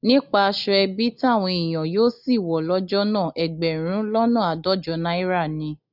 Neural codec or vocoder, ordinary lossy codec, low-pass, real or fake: none; none; 5.4 kHz; real